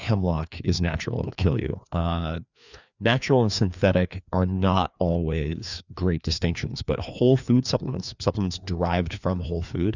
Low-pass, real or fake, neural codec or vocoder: 7.2 kHz; fake; codec, 16 kHz, 2 kbps, FreqCodec, larger model